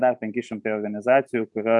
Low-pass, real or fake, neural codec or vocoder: 10.8 kHz; real; none